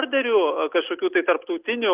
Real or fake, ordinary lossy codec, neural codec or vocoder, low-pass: real; Opus, 32 kbps; none; 3.6 kHz